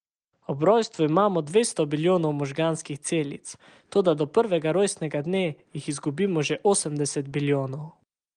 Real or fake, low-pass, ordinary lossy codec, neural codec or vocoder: real; 9.9 kHz; Opus, 24 kbps; none